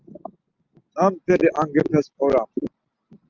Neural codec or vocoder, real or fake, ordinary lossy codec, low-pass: none; real; Opus, 24 kbps; 7.2 kHz